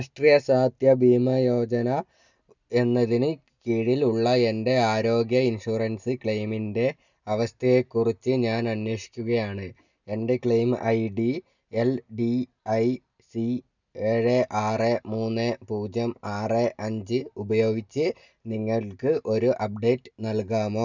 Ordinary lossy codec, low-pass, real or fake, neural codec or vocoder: none; 7.2 kHz; real; none